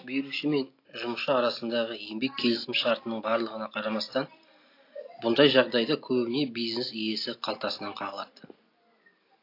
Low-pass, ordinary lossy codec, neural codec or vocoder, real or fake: 5.4 kHz; AAC, 32 kbps; none; real